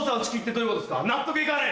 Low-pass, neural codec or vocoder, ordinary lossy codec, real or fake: none; none; none; real